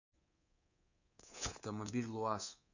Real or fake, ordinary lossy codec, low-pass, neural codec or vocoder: fake; none; 7.2 kHz; codec, 24 kHz, 3.1 kbps, DualCodec